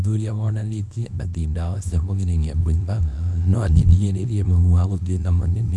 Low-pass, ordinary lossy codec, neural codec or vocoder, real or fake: none; none; codec, 24 kHz, 0.9 kbps, WavTokenizer, small release; fake